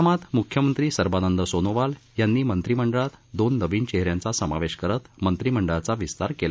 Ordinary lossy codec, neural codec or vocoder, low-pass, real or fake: none; none; none; real